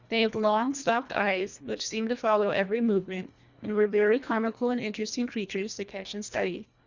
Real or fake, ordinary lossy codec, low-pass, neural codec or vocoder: fake; Opus, 64 kbps; 7.2 kHz; codec, 24 kHz, 1.5 kbps, HILCodec